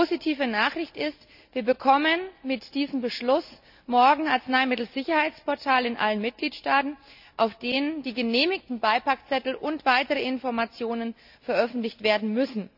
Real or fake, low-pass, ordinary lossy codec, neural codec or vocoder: real; 5.4 kHz; none; none